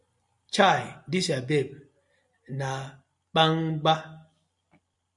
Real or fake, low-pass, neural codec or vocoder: real; 10.8 kHz; none